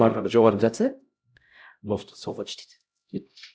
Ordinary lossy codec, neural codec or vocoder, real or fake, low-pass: none; codec, 16 kHz, 0.5 kbps, X-Codec, HuBERT features, trained on LibriSpeech; fake; none